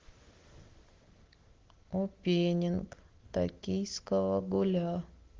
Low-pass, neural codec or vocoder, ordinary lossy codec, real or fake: 7.2 kHz; none; Opus, 16 kbps; real